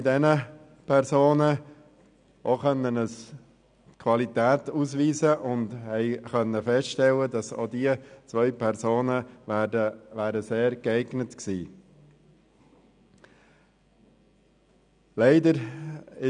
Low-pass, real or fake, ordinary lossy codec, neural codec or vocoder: 9.9 kHz; real; none; none